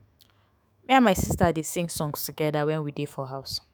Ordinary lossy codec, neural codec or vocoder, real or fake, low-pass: none; autoencoder, 48 kHz, 128 numbers a frame, DAC-VAE, trained on Japanese speech; fake; none